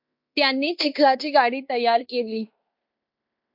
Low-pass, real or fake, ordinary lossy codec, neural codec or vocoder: 5.4 kHz; fake; MP3, 48 kbps; codec, 16 kHz in and 24 kHz out, 0.9 kbps, LongCat-Audio-Codec, fine tuned four codebook decoder